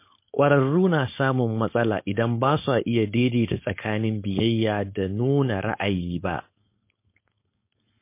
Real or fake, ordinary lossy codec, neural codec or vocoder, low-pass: fake; MP3, 24 kbps; codec, 16 kHz, 4.8 kbps, FACodec; 3.6 kHz